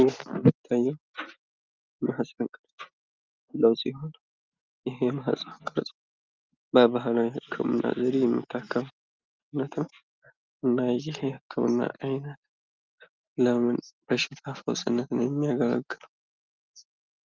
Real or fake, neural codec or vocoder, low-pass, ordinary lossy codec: real; none; 7.2 kHz; Opus, 32 kbps